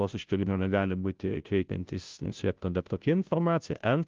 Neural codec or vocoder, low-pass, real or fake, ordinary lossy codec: codec, 16 kHz, 0.5 kbps, FunCodec, trained on Chinese and English, 25 frames a second; 7.2 kHz; fake; Opus, 32 kbps